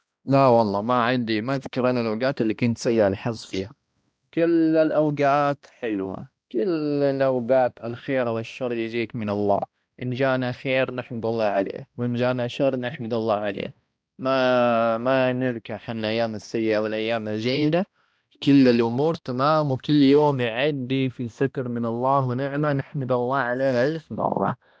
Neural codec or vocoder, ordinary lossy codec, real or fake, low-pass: codec, 16 kHz, 1 kbps, X-Codec, HuBERT features, trained on balanced general audio; none; fake; none